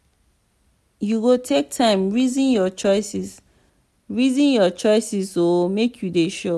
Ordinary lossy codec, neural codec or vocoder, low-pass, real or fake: none; none; none; real